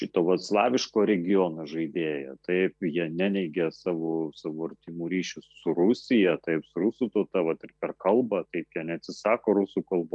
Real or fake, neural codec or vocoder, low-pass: real; none; 10.8 kHz